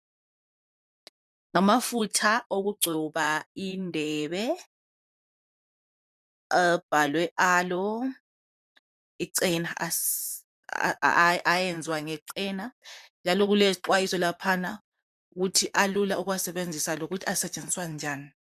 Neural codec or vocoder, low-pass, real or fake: vocoder, 44.1 kHz, 128 mel bands, Pupu-Vocoder; 14.4 kHz; fake